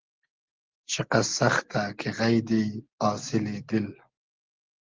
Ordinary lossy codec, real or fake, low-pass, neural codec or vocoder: Opus, 16 kbps; real; 7.2 kHz; none